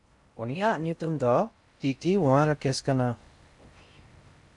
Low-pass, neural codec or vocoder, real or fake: 10.8 kHz; codec, 16 kHz in and 24 kHz out, 0.6 kbps, FocalCodec, streaming, 4096 codes; fake